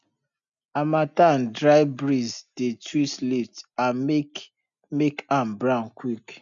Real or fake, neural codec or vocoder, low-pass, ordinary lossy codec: real; none; 7.2 kHz; none